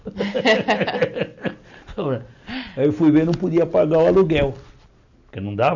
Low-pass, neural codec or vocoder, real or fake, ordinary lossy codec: 7.2 kHz; none; real; none